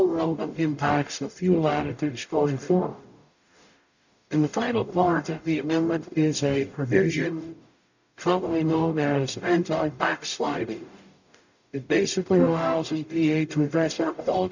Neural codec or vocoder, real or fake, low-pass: codec, 44.1 kHz, 0.9 kbps, DAC; fake; 7.2 kHz